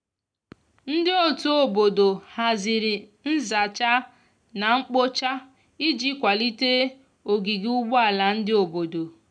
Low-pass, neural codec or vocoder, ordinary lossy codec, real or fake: 9.9 kHz; none; none; real